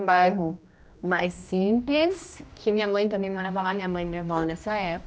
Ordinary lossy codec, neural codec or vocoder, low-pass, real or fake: none; codec, 16 kHz, 1 kbps, X-Codec, HuBERT features, trained on general audio; none; fake